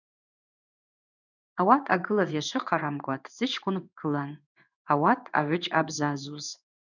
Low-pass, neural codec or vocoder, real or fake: 7.2 kHz; codec, 16 kHz in and 24 kHz out, 1 kbps, XY-Tokenizer; fake